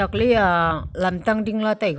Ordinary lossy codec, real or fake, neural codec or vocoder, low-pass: none; real; none; none